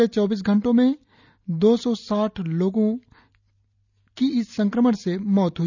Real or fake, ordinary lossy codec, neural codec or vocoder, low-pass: real; none; none; 7.2 kHz